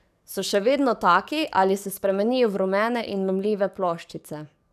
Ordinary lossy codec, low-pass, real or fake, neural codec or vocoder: none; 14.4 kHz; fake; codec, 44.1 kHz, 7.8 kbps, DAC